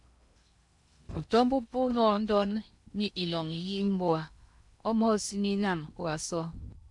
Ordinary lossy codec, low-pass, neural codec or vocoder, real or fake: MP3, 96 kbps; 10.8 kHz; codec, 16 kHz in and 24 kHz out, 0.8 kbps, FocalCodec, streaming, 65536 codes; fake